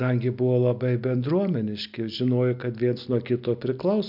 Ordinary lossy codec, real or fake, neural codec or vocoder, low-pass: MP3, 48 kbps; real; none; 5.4 kHz